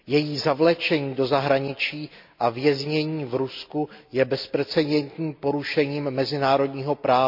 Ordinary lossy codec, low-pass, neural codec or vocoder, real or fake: MP3, 48 kbps; 5.4 kHz; none; real